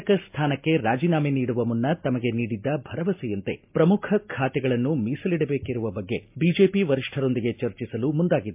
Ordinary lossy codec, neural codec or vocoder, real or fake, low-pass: MP3, 32 kbps; none; real; 3.6 kHz